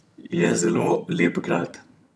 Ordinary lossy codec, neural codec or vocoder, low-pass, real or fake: none; vocoder, 22.05 kHz, 80 mel bands, HiFi-GAN; none; fake